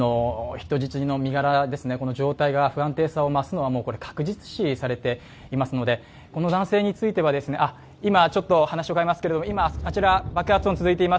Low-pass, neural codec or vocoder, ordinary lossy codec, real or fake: none; none; none; real